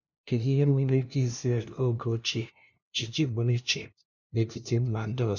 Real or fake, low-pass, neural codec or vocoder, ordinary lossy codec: fake; 7.2 kHz; codec, 16 kHz, 0.5 kbps, FunCodec, trained on LibriTTS, 25 frames a second; none